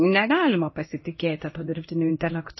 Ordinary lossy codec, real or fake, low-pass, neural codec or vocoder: MP3, 24 kbps; fake; 7.2 kHz; codec, 16 kHz in and 24 kHz out, 1 kbps, XY-Tokenizer